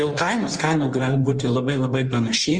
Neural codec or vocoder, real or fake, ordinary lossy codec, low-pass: codec, 16 kHz in and 24 kHz out, 1.1 kbps, FireRedTTS-2 codec; fake; Opus, 24 kbps; 9.9 kHz